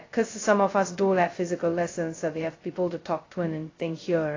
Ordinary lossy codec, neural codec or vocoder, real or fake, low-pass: AAC, 32 kbps; codec, 16 kHz, 0.2 kbps, FocalCodec; fake; 7.2 kHz